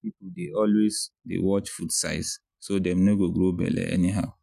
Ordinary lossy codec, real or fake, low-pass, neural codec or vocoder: none; real; 14.4 kHz; none